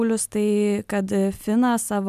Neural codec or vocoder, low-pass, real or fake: none; 14.4 kHz; real